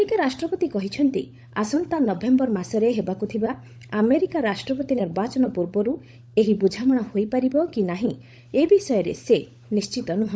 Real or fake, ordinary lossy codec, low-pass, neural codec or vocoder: fake; none; none; codec, 16 kHz, 16 kbps, FunCodec, trained on LibriTTS, 50 frames a second